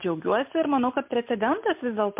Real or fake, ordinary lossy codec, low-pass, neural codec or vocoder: real; MP3, 32 kbps; 3.6 kHz; none